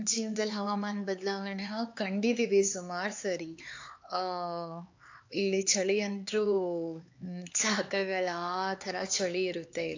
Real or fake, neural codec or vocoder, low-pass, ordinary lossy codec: fake; codec, 16 kHz, 4 kbps, X-Codec, HuBERT features, trained on LibriSpeech; 7.2 kHz; AAC, 48 kbps